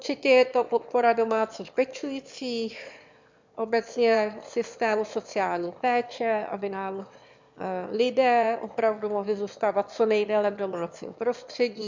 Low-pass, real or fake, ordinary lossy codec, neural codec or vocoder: 7.2 kHz; fake; MP3, 64 kbps; autoencoder, 22.05 kHz, a latent of 192 numbers a frame, VITS, trained on one speaker